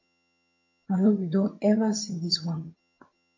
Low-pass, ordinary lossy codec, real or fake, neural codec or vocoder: 7.2 kHz; MP3, 48 kbps; fake; vocoder, 22.05 kHz, 80 mel bands, HiFi-GAN